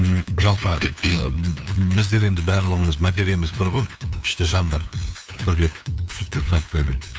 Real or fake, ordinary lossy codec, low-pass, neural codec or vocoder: fake; none; none; codec, 16 kHz, 2 kbps, FunCodec, trained on LibriTTS, 25 frames a second